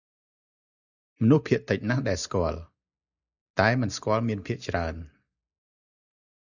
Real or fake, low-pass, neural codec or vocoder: real; 7.2 kHz; none